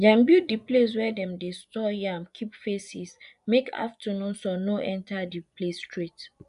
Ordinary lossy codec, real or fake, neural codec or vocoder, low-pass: none; fake; vocoder, 24 kHz, 100 mel bands, Vocos; 10.8 kHz